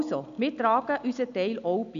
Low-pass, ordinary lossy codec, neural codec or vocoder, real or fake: 7.2 kHz; none; none; real